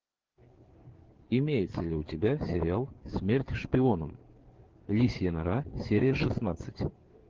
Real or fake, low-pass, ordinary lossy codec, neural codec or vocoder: fake; 7.2 kHz; Opus, 16 kbps; codec, 16 kHz, 4 kbps, FreqCodec, larger model